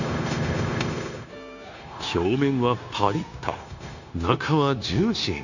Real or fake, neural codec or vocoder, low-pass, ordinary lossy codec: fake; codec, 16 kHz, 0.9 kbps, LongCat-Audio-Codec; 7.2 kHz; none